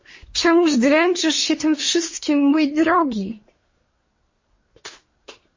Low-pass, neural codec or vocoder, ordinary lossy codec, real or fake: 7.2 kHz; codec, 24 kHz, 1 kbps, SNAC; MP3, 32 kbps; fake